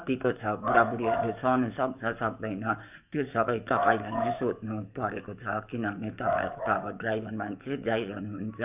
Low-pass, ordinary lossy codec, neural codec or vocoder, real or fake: 3.6 kHz; none; codec, 44.1 kHz, 7.8 kbps, DAC; fake